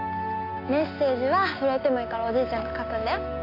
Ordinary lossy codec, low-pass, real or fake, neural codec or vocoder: AAC, 32 kbps; 5.4 kHz; real; none